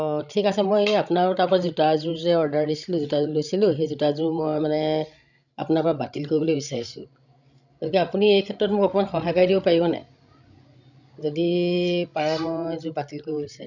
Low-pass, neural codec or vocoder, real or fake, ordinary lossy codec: 7.2 kHz; codec, 16 kHz, 16 kbps, FreqCodec, larger model; fake; none